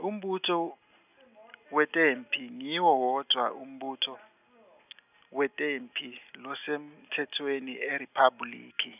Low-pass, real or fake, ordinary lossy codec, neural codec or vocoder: 3.6 kHz; real; none; none